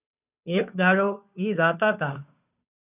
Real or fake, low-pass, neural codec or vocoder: fake; 3.6 kHz; codec, 16 kHz, 2 kbps, FunCodec, trained on Chinese and English, 25 frames a second